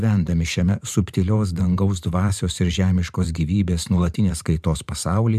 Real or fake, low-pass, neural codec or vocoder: fake; 14.4 kHz; vocoder, 44.1 kHz, 128 mel bands every 512 samples, BigVGAN v2